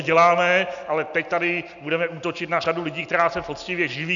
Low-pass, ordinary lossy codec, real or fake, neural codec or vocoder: 7.2 kHz; MP3, 96 kbps; real; none